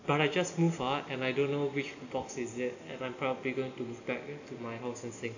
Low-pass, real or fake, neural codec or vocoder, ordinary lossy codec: 7.2 kHz; real; none; none